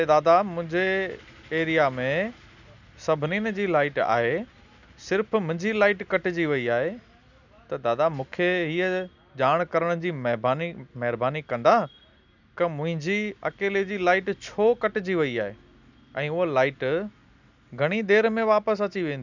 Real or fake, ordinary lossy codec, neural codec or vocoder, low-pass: real; none; none; 7.2 kHz